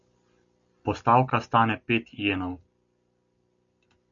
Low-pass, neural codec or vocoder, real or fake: 7.2 kHz; none; real